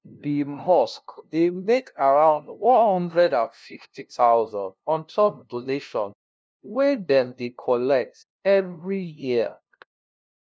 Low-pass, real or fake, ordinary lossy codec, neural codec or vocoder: none; fake; none; codec, 16 kHz, 0.5 kbps, FunCodec, trained on LibriTTS, 25 frames a second